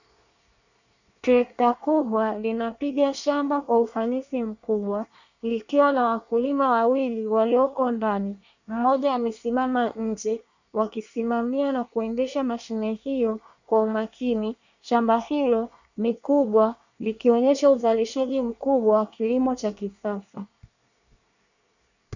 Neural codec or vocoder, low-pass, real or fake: codec, 24 kHz, 1 kbps, SNAC; 7.2 kHz; fake